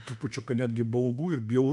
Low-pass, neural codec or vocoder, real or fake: 10.8 kHz; autoencoder, 48 kHz, 32 numbers a frame, DAC-VAE, trained on Japanese speech; fake